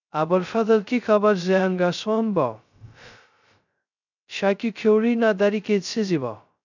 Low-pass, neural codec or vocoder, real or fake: 7.2 kHz; codec, 16 kHz, 0.2 kbps, FocalCodec; fake